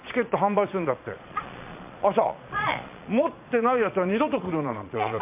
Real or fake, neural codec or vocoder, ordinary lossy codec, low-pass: fake; vocoder, 22.05 kHz, 80 mel bands, Vocos; MP3, 32 kbps; 3.6 kHz